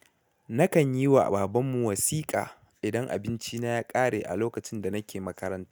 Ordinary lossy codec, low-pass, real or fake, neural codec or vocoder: none; none; real; none